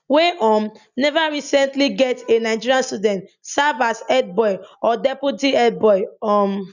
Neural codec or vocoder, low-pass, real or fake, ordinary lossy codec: none; 7.2 kHz; real; none